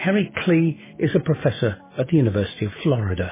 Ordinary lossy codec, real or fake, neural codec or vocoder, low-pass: MP3, 16 kbps; fake; autoencoder, 48 kHz, 128 numbers a frame, DAC-VAE, trained on Japanese speech; 3.6 kHz